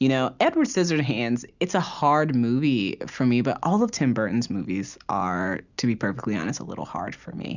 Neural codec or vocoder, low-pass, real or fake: none; 7.2 kHz; real